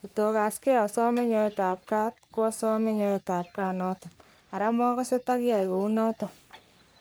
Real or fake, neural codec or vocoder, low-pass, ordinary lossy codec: fake; codec, 44.1 kHz, 3.4 kbps, Pupu-Codec; none; none